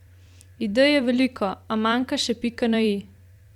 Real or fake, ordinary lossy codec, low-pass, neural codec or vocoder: fake; Opus, 64 kbps; 19.8 kHz; vocoder, 44.1 kHz, 128 mel bands every 256 samples, BigVGAN v2